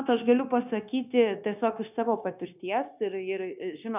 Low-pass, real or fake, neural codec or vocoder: 3.6 kHz; fake; codec, 16 kHz, 0.9 kbps, LongCat-Audio-Codec